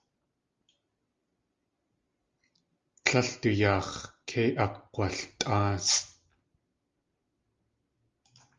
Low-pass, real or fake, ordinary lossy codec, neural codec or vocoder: 7.2 kHz; real; Opus, 32 kbps; none